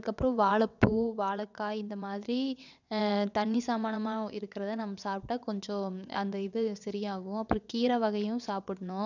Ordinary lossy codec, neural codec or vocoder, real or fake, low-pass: none; vocoder, 22.05 kHz, 80 mel bands, WaveNeXt; fake; 7.2 kHz